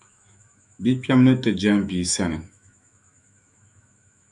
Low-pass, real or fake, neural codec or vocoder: 10.8 kHz; fake; autoencoder, 48 kHz, 128 numbers a frame, DAC-VAE, trained on Japanese speech